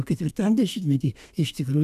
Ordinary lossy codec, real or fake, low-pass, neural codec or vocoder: AAC, 96 kbps; fake; 14.4 kHz; codec, 32 kHz, 1.9 kbps, SNAC